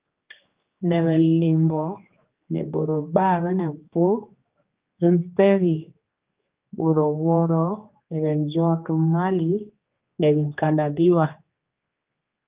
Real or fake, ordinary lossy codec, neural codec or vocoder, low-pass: fake; Opus, 32 kbps; codec, 16 kHz, 2 kbps, X-Codec, HuBERT features, trained on general audio; 3.6 kHz